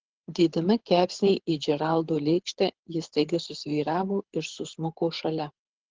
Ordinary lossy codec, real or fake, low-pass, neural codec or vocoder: Opus, 16 kbps; fake; 7.2 kHz; codec, 24 kHz, 6 kbps, HILCodec